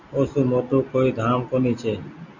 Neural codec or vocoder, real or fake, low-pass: none; real; 7.2 kHz